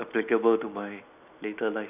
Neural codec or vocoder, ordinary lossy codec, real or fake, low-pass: none; none; real; 3.6 kHz